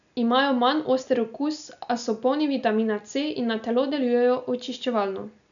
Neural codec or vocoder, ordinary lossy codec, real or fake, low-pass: none; none; real; 7.2 kHz